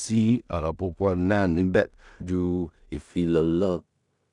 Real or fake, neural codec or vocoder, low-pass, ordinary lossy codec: fake; codec, 16 kHz in and 24 kHz out, 0.4 kbps, LongCat-Audio-Codec, two codebook decoder; 10.8 kHz; none